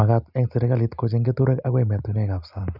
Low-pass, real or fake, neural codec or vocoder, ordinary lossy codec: 5.4 kHz; real; none; none